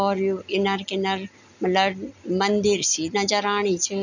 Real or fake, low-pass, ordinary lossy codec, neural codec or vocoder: real; 7.2 kHz; none; none